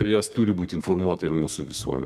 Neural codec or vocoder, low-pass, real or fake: codec, 32 kHz, 1.9 kbps, SNAC; 14.4 kHz; fake